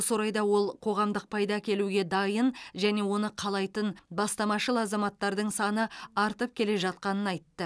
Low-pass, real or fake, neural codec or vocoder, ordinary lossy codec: none; real; none; none